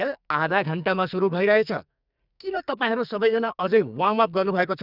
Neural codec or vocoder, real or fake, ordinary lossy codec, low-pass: codec, 44.1 kHz, 2.6 kbps, SNAC; fake; none; 5.4 kHz